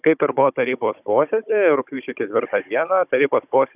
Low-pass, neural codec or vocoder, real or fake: 3.6 kHz; codec, 16 kHz, 16 kbps, FunCodec, trained on Chinese and English, 50 frames a second; fake